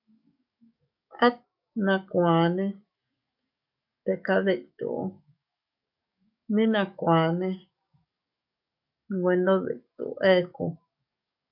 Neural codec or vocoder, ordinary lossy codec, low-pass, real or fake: codec, 44.1 kHz, 7.8 kbps, DAC; MP3, 48 kbps; 5.4 kHz; fake